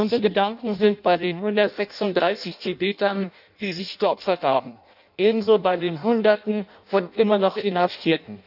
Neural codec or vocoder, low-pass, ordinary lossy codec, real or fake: codec, 16 kHz in and 24 kHz out, 0.6 kbps, FireRedTTS-2 codec; 5.4 kHz; none; fake